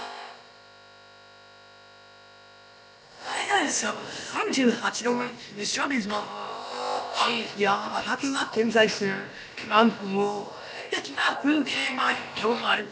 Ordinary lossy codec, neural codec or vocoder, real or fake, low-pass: none; codec, 16 kHz, about 1 kbps, DyCAST, with the encoder's durations; fake; none